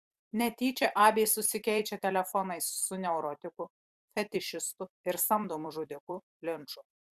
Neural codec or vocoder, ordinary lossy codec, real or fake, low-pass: vocoder, 44.1 kHz, 128 mel bands every 256 samples, BigVGAN v2; Opus, 64 kbps; fake; 14.4 kHz